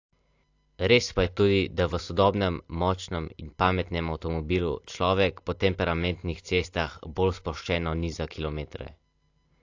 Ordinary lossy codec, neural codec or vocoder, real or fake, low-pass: AAC, 48 kbps; none; real; 7.2 kHz